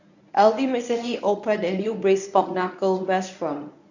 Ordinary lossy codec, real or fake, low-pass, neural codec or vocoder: none; fake; 7.2 kHz; codec, 24 kHz, 0.9 kbps, WavTokenizer, medium speech release version 1